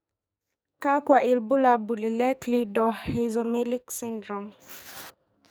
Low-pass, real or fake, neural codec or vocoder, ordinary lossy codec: none; fake; codec, 44.1 kHz, 2.6 kbps, SNAC; none